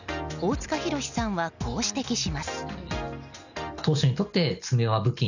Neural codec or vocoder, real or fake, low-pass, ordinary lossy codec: none; real; 7.2 kHz; none